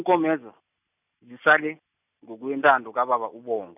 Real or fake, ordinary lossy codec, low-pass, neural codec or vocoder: real; none; 3.6 kHz; none